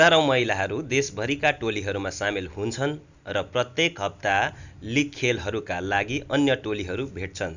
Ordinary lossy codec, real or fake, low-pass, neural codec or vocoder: none; real; 7.2 kHz; none